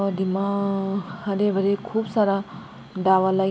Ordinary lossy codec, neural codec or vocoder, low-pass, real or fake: none; none; none; real